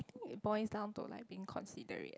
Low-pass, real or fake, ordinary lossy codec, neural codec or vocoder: none; real; none; none